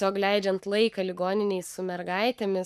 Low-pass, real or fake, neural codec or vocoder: 14.4 kHz; fake; codec, 44.1 kHz, 7.8 kbps, Pupu-Codec